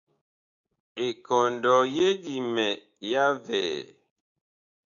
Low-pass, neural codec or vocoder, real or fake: 7.2 kHz; codec, 16 kHz, 6 kbps, DAC; fake